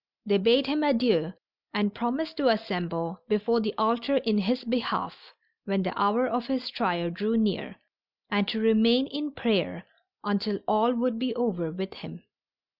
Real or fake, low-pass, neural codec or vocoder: real; 5.4 kHz; none